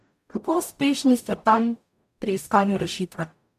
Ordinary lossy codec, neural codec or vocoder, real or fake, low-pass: none; codec, 44.1 kHz, 0.9 kbps, DAC; fake; 14.4 kHz